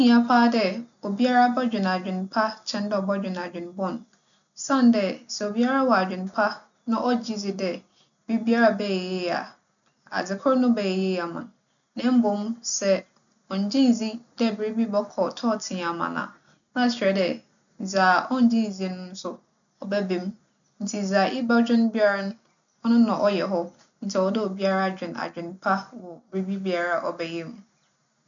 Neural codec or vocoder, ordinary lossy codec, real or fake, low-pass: none; none; real; 7.2 kHz